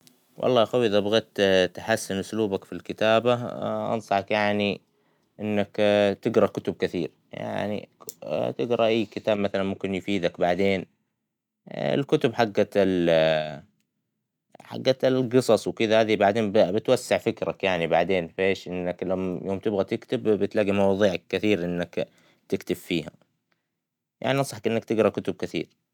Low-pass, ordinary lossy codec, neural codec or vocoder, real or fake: 19.8 kHz; none; none; real